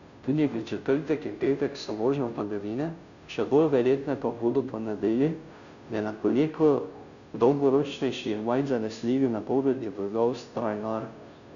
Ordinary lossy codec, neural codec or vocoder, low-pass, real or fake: none; codec, 16 kHz, 0.5 kbps, FunCodec, trained on Chinese and English, 25 frames a second; 7.2 kHz; fake